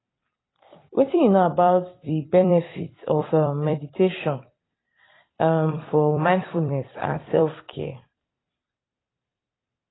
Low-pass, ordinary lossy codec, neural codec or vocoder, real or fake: 7.2 kHz; AAC, 16 kbps; vocoder, 44.1 kHz, 128 mel bands every 256 samples, BigVGAN v2; fake